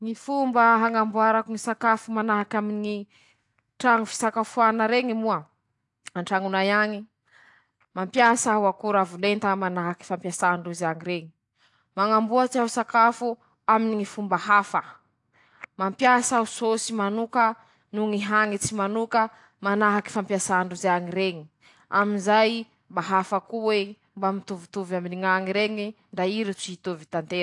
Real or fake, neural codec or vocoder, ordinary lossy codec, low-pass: real; none; AAC, 64 kbps; 10.8 kHz